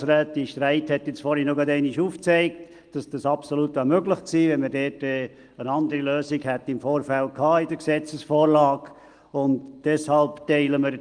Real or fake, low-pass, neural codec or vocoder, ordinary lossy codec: real; 9.9 kHz; none; Opus, 24 kbps